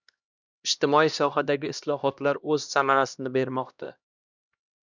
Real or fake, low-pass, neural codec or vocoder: fake; 7.2 kHz; codec, 16 kHz, 1 kbps, X-Codec, HuBERT features, trained on LibriSpeech